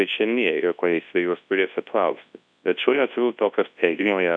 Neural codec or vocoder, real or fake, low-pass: codec, 24 kHz, 0.9 kbps, WavTokenizer, large speech release; fake; 9.9 kHz